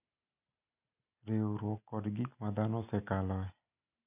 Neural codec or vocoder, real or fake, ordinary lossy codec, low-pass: none; real; none; 3.6 kHz